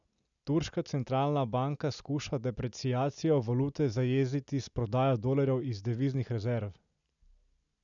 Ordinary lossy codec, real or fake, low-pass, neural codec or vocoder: none; real; 7.2 kHz; none